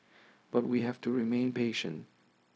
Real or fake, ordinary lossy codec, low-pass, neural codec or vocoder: fake; none; none; codec, 16 kHz, 0.4 kbps, LongCat-Audio-Codec